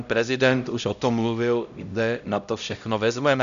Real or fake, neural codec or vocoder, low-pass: fake; codec, 16 kHz, 0.5 kbps, X-Codec, HuBERT features, trained on LibriSpeech; 7.2 kHz